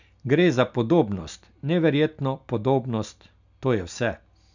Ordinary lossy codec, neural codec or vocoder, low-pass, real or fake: none; none; 7.2 kHz; real